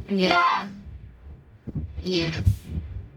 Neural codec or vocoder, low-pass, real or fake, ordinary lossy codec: codec, 44.1 kHz, 0.9 kbps, DAC; 19.8 kHz; fake; MP3, 96 kbps